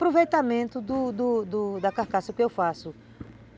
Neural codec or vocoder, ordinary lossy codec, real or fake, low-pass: none; none; real; none